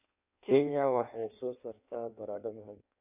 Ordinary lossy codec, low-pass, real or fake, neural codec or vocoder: none; 3.6 kHz; fake; codec, 16 kHz in and 24 kHz out, 1.1 kbps, FireRedTTS-2 codec